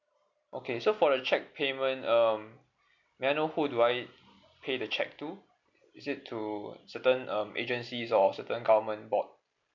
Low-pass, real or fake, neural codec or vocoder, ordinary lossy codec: 7.2 kHz; real; none; MP3, 64 kbps